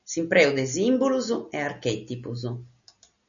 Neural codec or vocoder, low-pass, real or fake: none; 7.2 kHz; real